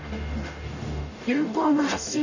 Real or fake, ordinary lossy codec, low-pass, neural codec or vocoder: fake; none; 7.2 kHz; codec, 44.1 kHz, 0.9 kbps, DAC